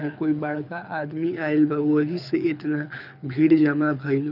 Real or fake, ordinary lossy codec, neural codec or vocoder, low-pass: fake; none; codec, 24 kHz, 6 kbps, HILCodec; 5.4 kHz